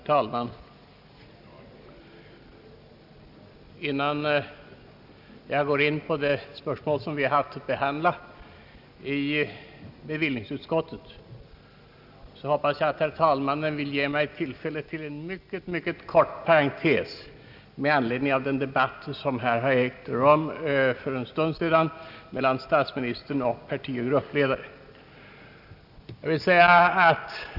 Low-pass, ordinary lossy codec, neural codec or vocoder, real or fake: 5.4 kHz; none; none; real